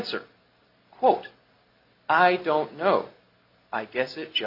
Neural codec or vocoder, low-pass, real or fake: none; 5.4 kHz; real